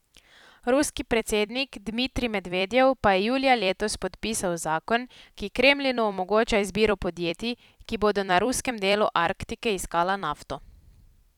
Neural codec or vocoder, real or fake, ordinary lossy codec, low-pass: vocoder, 44.1 kHz, 128 mel bands every 256 samples, BigVGAN v2; fake; none; 19.8 kHz